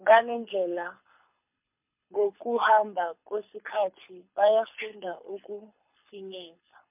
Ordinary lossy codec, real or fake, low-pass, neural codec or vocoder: MP3, 32 kbps; fake; 3.6 kHz; codec, 24 kHz, 6 kbps, HILCodec